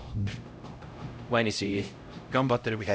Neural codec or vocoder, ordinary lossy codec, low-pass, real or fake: codec, 16 kHz, 0.5 kbps, X-Codec, HuBERT features, trained on LibriSpeech; none; none; fake